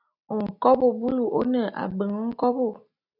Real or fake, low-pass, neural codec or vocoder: real; 5.4 kHz; none